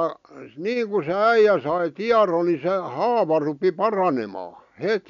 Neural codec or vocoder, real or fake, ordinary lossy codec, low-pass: none; real; none; 7.2 kHz